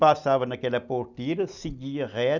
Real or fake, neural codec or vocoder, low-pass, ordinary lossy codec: real; none; 7.2 kHz; none